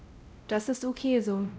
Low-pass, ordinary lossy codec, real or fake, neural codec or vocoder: none; none; fake; codec, 16 kHz, 0.5 kbps, X-Codec, WavLM features, trained on Multilingual LibriSpeech